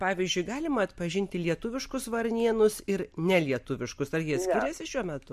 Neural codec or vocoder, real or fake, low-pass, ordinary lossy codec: none; real; 14.4 kHz; MP3, 64 kbps